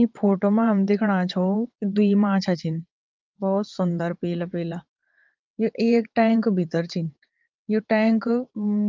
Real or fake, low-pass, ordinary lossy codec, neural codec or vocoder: fake; 7.2 kHz; Opus, 24 kbps; vocoder, 22.05 kHz, 80 mel bands, WaveNeXt